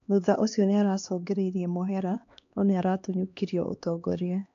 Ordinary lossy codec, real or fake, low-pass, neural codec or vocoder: AAC, 64 kbps; fake; 7.2 kHz; codec, 16 kHz, 2 kbps, X-Codec, HuBERT features, trained on LibriSpeech